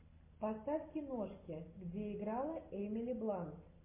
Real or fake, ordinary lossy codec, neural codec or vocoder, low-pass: real; MP3, 24 kbps; none; 3.6 kHz